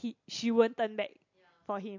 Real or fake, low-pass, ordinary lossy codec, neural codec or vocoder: real; 7.2 kHz; MP3, 48 kbps; none